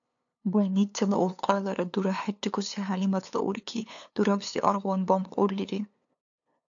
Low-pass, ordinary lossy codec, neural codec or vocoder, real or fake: 7.2 kHz; AAC, 64 kbps; codec, 16 kHz, 2 kbps, FunCodec, trained on LibriTTS, 25 frames a second; fake